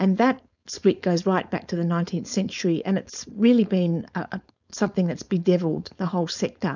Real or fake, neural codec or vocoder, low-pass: fake; codec, 16 kHz, 4.8 kbps, FACodec; 7.2 kHz